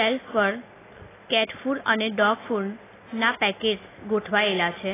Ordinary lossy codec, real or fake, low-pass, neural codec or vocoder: AAC, 16 kbps; real; 3.6 kHz; none